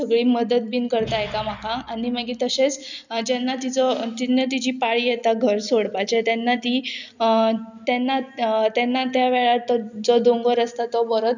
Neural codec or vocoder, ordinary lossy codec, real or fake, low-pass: none; none; real; 7.2 kHz